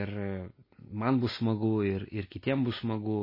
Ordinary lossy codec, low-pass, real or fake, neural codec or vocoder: MP3, 24 kbps; 5.4 kHz; real; none